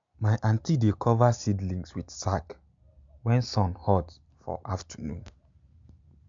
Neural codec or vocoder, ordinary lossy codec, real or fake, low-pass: none; MP3, 64 kbps; real; 7.2 kHz